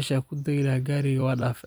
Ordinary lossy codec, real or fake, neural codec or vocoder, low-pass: none; fake; vocoder, 44.1 kHz, 128 mel bands every 256 samples, BigVGAN v2; none